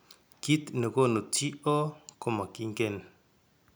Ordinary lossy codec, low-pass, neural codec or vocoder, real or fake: none; none; none; real